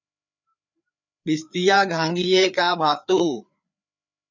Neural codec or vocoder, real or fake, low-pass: codec, 16 kHz, 4 kbps, FreqCodec, larger model; fake; 7.2 kHz